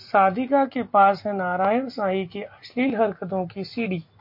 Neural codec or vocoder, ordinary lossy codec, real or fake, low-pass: none; MP3, 32 kbps; real; 5.4 kHz